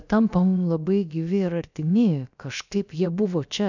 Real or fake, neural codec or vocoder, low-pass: fake; codec, 16 kHz, about 1 kbps, DyCAST, with the encoder's durations; 7.2 kHz